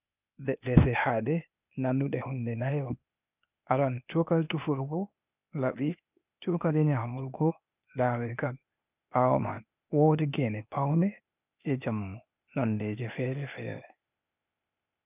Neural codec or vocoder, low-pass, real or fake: codec, 16 kHz, 0.8 kbps, ZipCodec; 3.6 kHz; fake